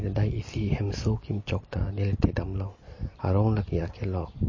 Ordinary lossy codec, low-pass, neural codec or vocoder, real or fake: MP3, 32 kbps; 7.2 kHz; none; real